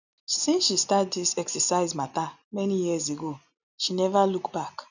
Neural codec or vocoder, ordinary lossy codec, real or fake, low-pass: none; none; real; 7.2 kHz